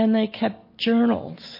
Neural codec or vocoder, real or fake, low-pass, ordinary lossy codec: none; real; 5.4 kHz; MP3, 32 kbps